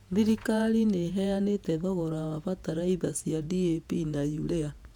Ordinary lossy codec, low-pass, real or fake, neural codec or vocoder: none; 19.8 kHz; fake; vocoder, 48 kHz, 128 mel bands, Vocos